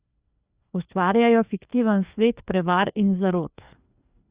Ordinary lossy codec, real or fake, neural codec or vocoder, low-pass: Opus, 64 kbps; fake; codec, 16 kHz, 2 kbps, FreqCodec, larger model; 3.6 kHz